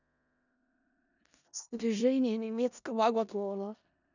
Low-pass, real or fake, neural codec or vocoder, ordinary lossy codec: 7.2 kHz; fake; codec, 16 kHz in and 24 kHz out, 0.4 kbps, LongCat-Audio-Codec, four codebook decoder; none